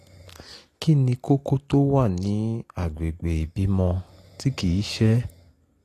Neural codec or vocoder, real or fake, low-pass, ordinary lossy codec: none; real; 14.4 kHz; AAC, 48 kbps